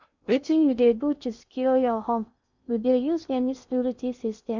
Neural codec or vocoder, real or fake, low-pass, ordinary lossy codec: codec, 16 kHz in and 24 kHz out, 0.6 kbps, FocalCodec, streaming, 2048 codes; fake; 7.2 kHz; none